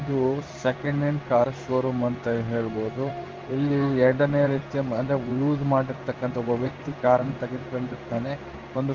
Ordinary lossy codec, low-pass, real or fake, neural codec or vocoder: Opus, 32 kbps; 7.2 kHz; fake; codec, 16 kHz in and 24 kHz out, 1 kbps, XY-Tokenizer